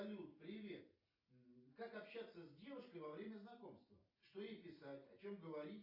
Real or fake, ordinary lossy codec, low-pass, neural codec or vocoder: real; AAC, 32 kbps; 5.4 kHz; none